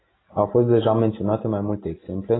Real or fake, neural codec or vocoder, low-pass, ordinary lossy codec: real; none; 7.2 kHz; AAC, 16 kbps